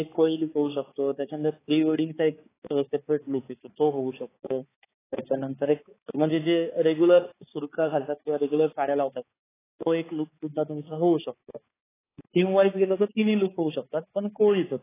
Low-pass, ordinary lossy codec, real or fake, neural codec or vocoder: 3.6 kHz; AAC, 16 kbps; fake; codec, 16 kHz, 4 kbps, X-Codec, HuBERT features, trained on balanced general audio